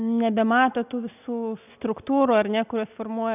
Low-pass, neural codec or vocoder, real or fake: 3.6 kHz; none; real